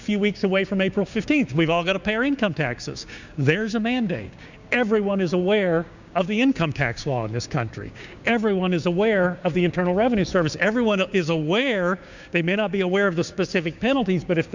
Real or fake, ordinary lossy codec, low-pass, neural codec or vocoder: fake; Opus, 64 kbps; 7.2 kHz; codec, 16 kHz, 6 kbps, DAC